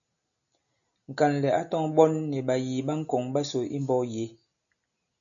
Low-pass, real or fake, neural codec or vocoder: 7.2 kHz; real; none